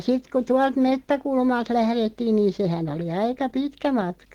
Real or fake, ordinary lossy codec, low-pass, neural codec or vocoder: real; Opus, 16 kbps; 19.8 kHz; none